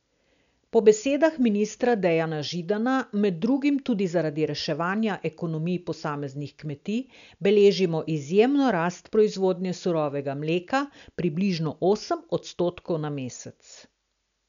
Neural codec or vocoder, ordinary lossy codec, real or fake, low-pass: none; none; real; 7.2 kHz